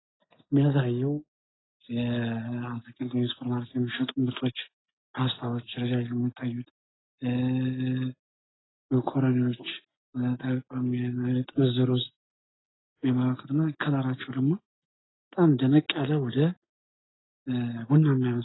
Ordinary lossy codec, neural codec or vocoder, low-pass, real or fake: AAC, 16 kbps; none; 7.2 kHz; real